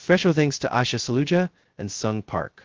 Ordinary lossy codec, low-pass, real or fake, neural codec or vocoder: Opus, 16 kbps; 7.2 kHz; fake; codec, 16 kHz, 0.2 kbps, FocalCodec